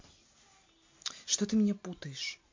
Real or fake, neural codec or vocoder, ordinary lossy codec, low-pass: real; none; MP3, 48 kbps; 7.2 kHz